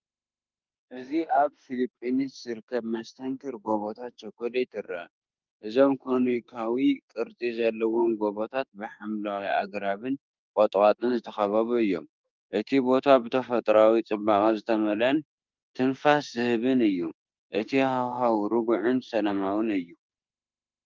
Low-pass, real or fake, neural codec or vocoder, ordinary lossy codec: 7.2 kHz; fake; autoencoder, 48 kHz, 32 numbers a frame, DAC-VAE, trained on Japanese speech; Opus, 24 kbps